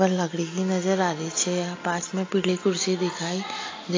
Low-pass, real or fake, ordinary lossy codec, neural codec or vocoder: 7.2 kHz; real; AAC, 32 kbps; none